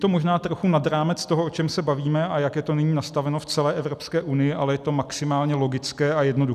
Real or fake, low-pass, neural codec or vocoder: real; 14.4 kHz; none